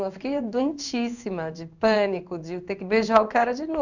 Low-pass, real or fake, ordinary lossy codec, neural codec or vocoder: 7.2 kHz; fake; none; codec, 16 kHz in and 24 kHz out, 1 kbps, XY-Tokenizer